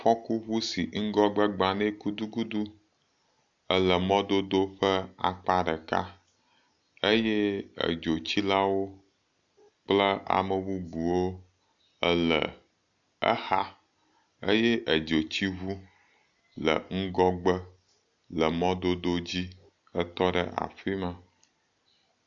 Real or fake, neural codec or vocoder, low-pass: real; none; 7.2 kHz